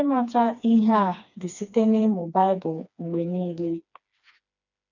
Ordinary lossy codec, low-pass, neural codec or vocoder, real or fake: none; 7.2 kHz; codec, 16 kHz, 2 kbps, FreqCodec, smaller model; fake